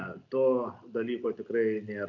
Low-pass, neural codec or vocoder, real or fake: 7.2 kHz; none; real